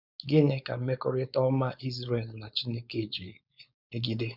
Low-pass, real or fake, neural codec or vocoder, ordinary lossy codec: 5.4 kHz; fake; codec, 16 kHz, 4.8 kbps, FACodec; none